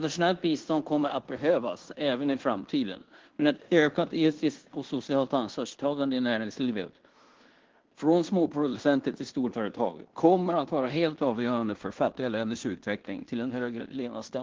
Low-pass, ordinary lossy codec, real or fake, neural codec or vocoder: 7.2 kHz; Opus, 16 kbps; fake; codec, 16 kHz in and 24 kHz out, 0.9 kbps, LongCat-Audio-Codec, fine tuned four codebook decoder